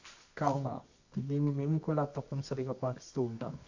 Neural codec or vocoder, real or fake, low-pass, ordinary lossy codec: codec, 24 kHz, 0.9 kbps, WavTokenizer, medium music audio release; fake; 7.2 kHz; AAC, 48 kbps